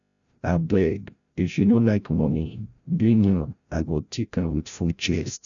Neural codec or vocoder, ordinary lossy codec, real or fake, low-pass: codec, 16 kHz, 0.5 kbps, FreqCodec, larger model; none; fake; 7.2 kHz